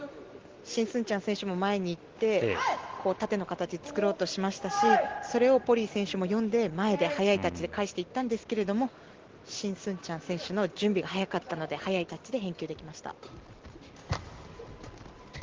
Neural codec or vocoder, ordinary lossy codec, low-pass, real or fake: none; Opus, 16 kbps; 7.2 kHz; real